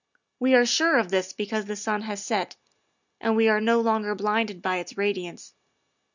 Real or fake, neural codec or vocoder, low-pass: real; none; 7.2 kHz